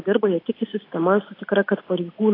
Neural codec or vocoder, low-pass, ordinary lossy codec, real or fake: none; 5.4 kHz; AAC, 24 kbps; real